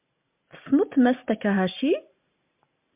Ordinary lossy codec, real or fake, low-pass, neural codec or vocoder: MP3, 32 kbps; real; 3.6 kHz; none